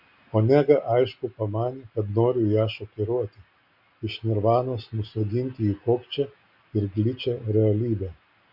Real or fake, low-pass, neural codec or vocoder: real; 5.4 kHz; none